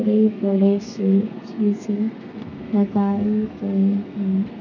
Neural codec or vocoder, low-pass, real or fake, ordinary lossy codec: codec, 32 kHz, 1.9 kbps, SNAC; 7.2 kHz; fake; none